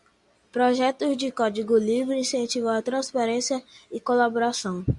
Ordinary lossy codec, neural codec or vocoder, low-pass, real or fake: Opus, 64 kbps; none; 10.8 kHz; real